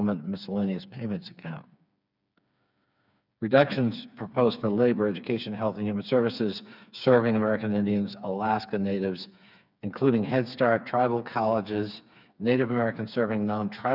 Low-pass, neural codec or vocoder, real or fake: 5.4 kHz; codec, 16 kHz, 4 kbps, FreqCodec, smaller model; fake